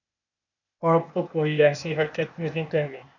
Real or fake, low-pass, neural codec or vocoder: fake; 7.2 kHz; codec, 16 kHz, 0.8 kbps, ZipCodec